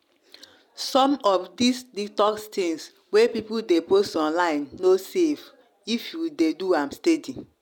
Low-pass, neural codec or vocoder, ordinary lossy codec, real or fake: 19.8 kHz; none; none; real